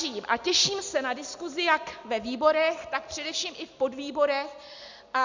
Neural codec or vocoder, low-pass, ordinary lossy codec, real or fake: none; 7.2 kHz; Opus, 64 kbps; real